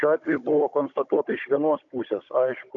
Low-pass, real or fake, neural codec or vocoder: 7.2 kHz; fake; codec, 16 kHz, 16 kbps, FunCodec, trained on Chinese and English, 50 frames a second